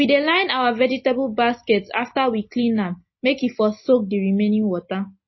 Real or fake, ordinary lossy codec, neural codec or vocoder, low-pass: real; MP3, 24 kbps; none; 7.2 kHz